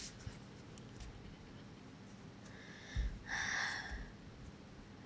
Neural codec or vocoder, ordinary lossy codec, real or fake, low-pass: none; none; real; none